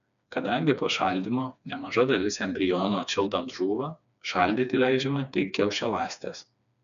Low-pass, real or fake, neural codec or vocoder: 7.2 kHz; fake; codec, 16 kHz, 2 kbps, FreqCodec, smaller model